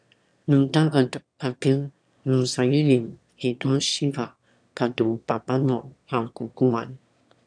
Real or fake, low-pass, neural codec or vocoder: fake; 9.9 kHz; autoencoder, 22.05 kHz, a latent of 192 numbers a frame, VITS, trained on one speaker